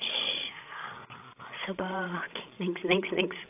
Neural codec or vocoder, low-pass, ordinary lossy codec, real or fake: codec, 16 kHz, 8 kbps, FreqCodec, larger model; 3.6 kHz; AAC, 32 kbps; fake